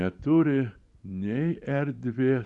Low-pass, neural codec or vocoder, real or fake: 10.8 kHz; vocoder, 44.1 kHz, 128 mel bands every 512 samples, BigVGAN v2; fake